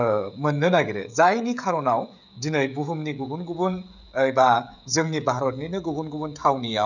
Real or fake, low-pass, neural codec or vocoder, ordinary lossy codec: fake; 7.2 kHz; codec, 16 kHz, 16 kbps, FreqCodec, smaller model; none